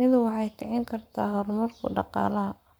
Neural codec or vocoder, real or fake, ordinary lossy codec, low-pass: codec, 44.1 kHz, 7.8 kbps, Pupu-Codec; fake; none; none